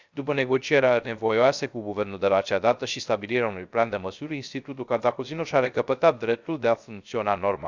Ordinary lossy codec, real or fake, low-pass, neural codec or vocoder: Opus, 64 kbps; fake; 7.2 kHz; codec, 16 kHz, 0.3 kbps, FocalCodec